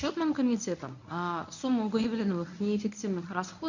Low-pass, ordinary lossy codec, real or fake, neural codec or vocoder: 7.2 kHz; none; fake; codec, 24 kHz, 0.9 kbps, WavTokenizer, medium speech release version 1